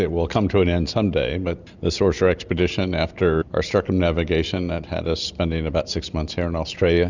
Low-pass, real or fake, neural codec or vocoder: 7.2 kHz; real; none